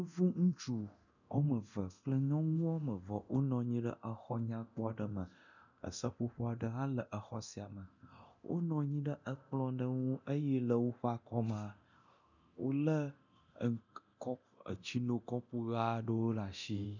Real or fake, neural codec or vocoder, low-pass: fake; codec, 24 kHz, 0.9 kbps, DualCodec; 7.2 kHz